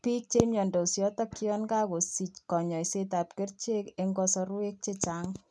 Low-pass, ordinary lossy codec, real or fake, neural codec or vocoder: none; none; real; none